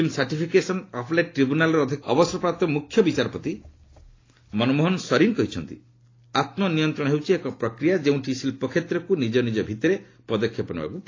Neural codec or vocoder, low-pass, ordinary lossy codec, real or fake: none; 7.2 kHz; AAC, 32 kbps; real